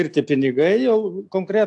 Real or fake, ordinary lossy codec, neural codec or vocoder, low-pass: real; AAC, 64 kbps; none; 10.8 kHz